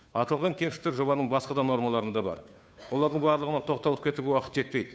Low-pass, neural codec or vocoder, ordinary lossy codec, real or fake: none; codec, 16 kHz, 2 kbps, FunCodec, trained on Chinese and English, 25 frames a second; none; fake